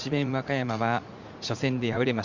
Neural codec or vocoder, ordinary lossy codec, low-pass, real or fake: vocoder, 44.1 kHz, 80 mel bands, Vocos; Opus, 64 kbps; 7.2 kHz; fake